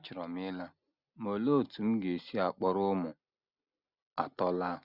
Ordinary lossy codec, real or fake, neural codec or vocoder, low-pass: none; real; none; 5.4 kHz